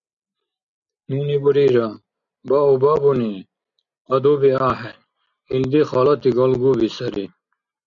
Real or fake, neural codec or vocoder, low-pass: real; none; 7.2 kHz